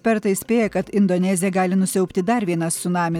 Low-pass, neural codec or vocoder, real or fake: 19.8 kHz; none; real